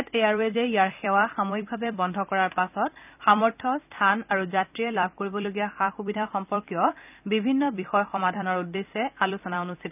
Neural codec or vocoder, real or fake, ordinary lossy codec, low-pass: vocoder, 44.1 kHz, 128 mel bands every 256 samples, BigVGAN v2; fake; none; 3.6 kHz